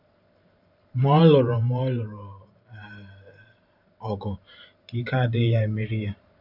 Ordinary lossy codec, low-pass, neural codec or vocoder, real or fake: none; 5.4 kHz; vocoder, 44.1 kHz, 128 mel bands every 512 samples, BigVGAN v2; fake